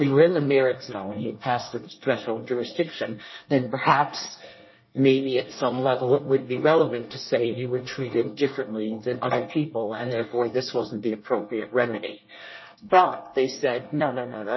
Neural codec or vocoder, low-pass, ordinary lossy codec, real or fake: codec, 24 kHz, 1 kbps, SNAC; 7.2 kHz; MP3, 24 kbps; fake